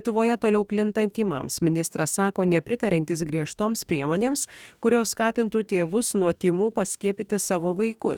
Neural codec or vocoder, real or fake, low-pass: codec, 44.1 kHz, 2.6 kbps, DAC; fake; 19.8 kHz